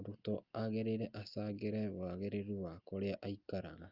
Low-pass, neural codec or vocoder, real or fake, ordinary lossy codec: 5.4 kHz; none; real; Opus, 32 kbps